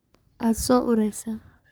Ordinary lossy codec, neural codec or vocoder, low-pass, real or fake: none; codec, 44.1 kHz, 3.4 kbps, Pupu-Codec; none; fake